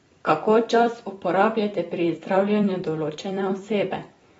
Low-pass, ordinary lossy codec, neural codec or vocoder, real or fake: 19.8 kHz; AAC, 24 kbps; vocoder, 44.1 kHz, 128 mel bands every 256 samples, BigVGAN v2; fake